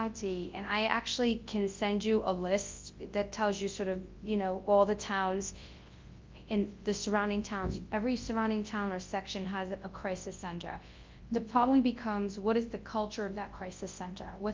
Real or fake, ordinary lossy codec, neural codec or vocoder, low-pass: fake; Opus, 32 kbps; codec, 24 kHz, 0.9 kbps, WavTokenizer, large speech release; 7.2 kHz